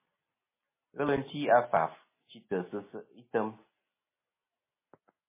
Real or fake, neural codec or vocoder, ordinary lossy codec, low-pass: real; none; MP3, 16 kbps; 3.6 kHz